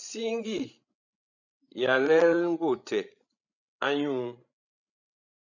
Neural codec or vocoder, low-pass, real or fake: codec, 16 kHz, 16 kbps, FreqCodec, larger model; 7.2 kHz; fake